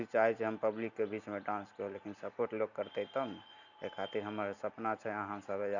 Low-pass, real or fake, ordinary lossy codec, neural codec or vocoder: 7.2 kHz; real; none; none